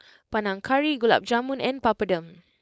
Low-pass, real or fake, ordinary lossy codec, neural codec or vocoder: none; fake; none; codec, 16 kHz, 4.8 kbps, FACodec